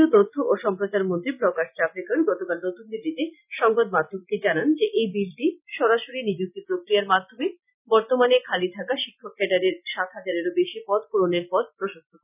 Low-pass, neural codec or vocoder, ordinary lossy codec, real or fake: 3.6 kHz; none; none; real